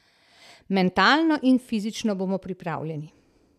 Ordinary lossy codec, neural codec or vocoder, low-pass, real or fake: none; none; 14.4 kHz; real